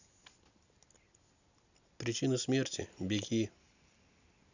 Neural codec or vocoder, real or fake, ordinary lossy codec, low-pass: none; real; none; 7.2 kHz